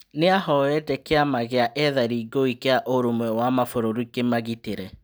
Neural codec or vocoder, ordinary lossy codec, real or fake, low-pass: none; none; real; none